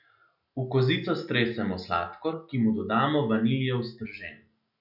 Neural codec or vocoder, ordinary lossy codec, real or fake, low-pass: none; none; real; 5.4 kHz